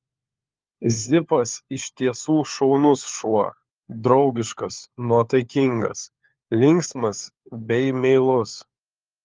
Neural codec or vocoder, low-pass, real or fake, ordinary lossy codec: codec, 16 kHz, 4 kbps, FunCodec, trained on LibriTTS, 50 frames a second; 7.2 kHz; fake; Opus, 32 kbps